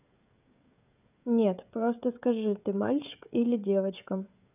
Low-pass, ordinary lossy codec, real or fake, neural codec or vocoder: 3.6 kHz; none; fake; codec, 16 kHz, 16 kbps, FreqCodec, smaller model